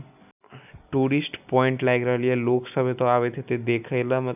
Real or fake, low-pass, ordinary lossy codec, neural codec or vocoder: real; 3.6 kHz; none; none